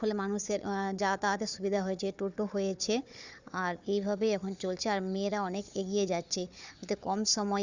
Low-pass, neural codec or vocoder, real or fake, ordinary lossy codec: 7.2 kHz; codec, 16 kHz, 4 kbps, FunCodec, trained on Chinese and English, 50 frames a second; fake; Opus, 64 kbps